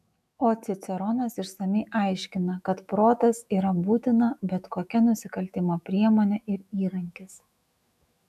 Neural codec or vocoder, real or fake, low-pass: autoencoder, 48 kHz, 128 numbers a frame, DAC-VAE, trained on Japanese speech; fake; 14.4 kHz